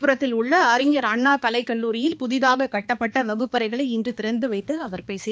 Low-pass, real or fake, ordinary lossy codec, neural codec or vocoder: none; fake; none; codec, 16 kHz, 2 kbps, X-Codec, HuBERT features, trained on balanced general audio